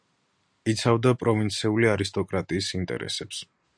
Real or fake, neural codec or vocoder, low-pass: real; none; 9.9 kHz